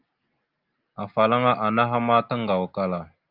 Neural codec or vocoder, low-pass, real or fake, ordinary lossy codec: none; 5.4 kHz; real; Opus, 32 kbps